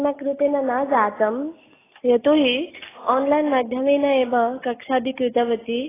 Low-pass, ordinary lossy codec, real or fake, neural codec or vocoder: 3.6 kHz; AAC, 16 kbps; real; none